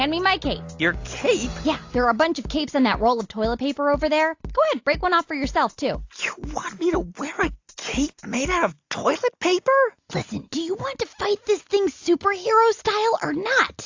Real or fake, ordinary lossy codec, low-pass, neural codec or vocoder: real; AAC, 48 kbps; 7.2 kHz; none